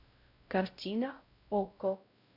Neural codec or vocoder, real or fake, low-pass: codec, 16 kHz, 0.5 kbps, X-Codec, WavLM features, trained on Multilingual LibriSpeech; fake; 5.4 kHz